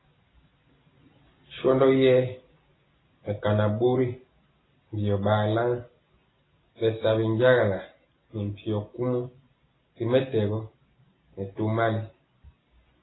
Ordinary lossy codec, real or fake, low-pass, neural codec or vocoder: AAC, 16 kbps; real; 7.2 kHz; none